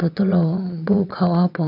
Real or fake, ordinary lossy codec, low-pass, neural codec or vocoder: fake; none; 5.4 kHz; vocoder, 22.05 kHz, 80 mel bands, WaveNeXt